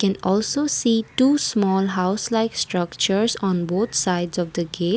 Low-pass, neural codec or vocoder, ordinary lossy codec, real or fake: none; none; none; real